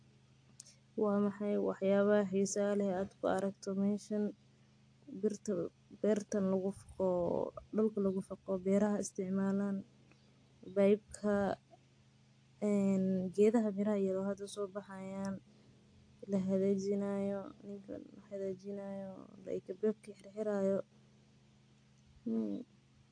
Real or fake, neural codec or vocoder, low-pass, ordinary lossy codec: real; none; 9.9 kHz; AAC, 48 kbps